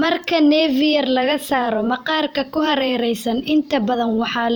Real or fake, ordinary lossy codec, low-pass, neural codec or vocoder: fake; none; none; vocoder, 44.1 kHz, 128 mel bands every 512 samples, BigVGAN v2